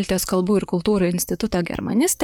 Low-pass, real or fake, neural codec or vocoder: 19.8 kHz; fake; vocoder, 44.1 kHz, 128 mel bands, Pupu-Vocoder